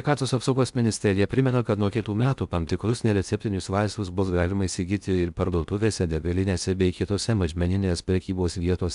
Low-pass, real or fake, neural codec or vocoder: 10.8 kHz; fake; codec, 16 kHz in and 24 kHz out, 0.6 kbps, FocalCodec, streaming, 2048 codes